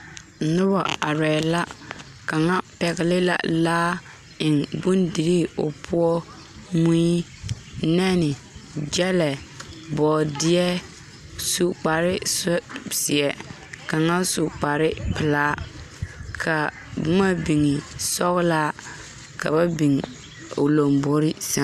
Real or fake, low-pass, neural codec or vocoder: real; 14.4 kHz; none